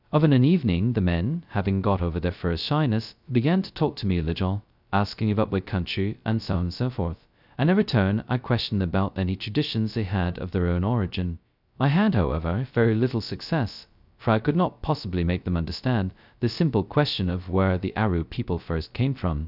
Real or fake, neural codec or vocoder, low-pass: fake; codec, 16 kHz, 0.2 kbps, FocalCodec; 5.4 kHz